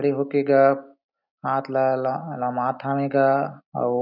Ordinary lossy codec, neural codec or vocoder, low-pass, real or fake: none; none; 5.4 kHz; real